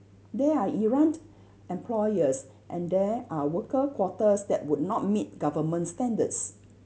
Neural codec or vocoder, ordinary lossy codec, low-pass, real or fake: none; none; none; real